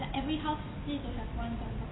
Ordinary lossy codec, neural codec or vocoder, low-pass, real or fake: AAC, 16 kbps; none; 7.2 kHz; real